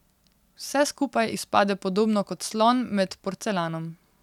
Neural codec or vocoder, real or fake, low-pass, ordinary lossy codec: none; real; 19.8 kHz; none